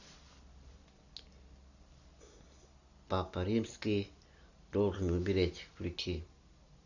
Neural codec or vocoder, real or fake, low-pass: none; real; 7.2 kHz